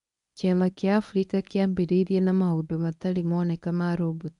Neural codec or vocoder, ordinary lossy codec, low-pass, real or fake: codec, 24 kHz, 0.9 kbps, WavTokenizer, medium speech release version 1; MP3, 96 kbps; 10.8 kHz; fake